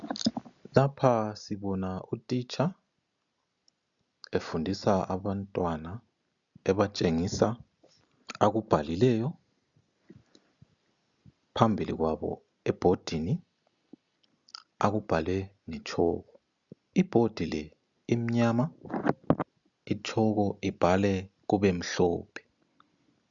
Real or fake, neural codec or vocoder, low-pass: real; none; 7.2 kHz